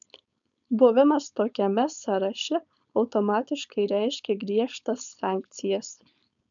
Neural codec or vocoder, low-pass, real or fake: codec, 16 kHz, 4.8 kbps, FACodec; 7.2 kHz; fake